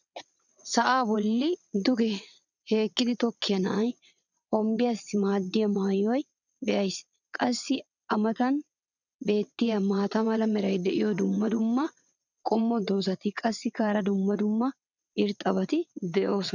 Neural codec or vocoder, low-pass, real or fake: vocoder, 22.05 kHz, 80 mel bands, WaveNeXt; 7.2 kHz; fake